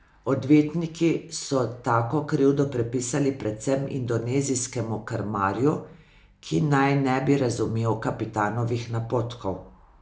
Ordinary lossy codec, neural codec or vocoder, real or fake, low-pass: none; none; real; none